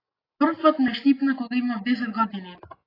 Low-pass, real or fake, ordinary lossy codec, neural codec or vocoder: 5.4 kHz; real; AAC, 24 kbps; none